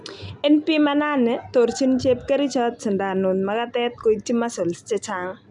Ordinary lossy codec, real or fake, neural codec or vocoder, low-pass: none; real; none; 10.8 kHz